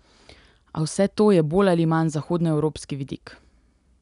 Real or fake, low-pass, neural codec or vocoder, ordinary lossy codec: real; 10.8 kHz; none; none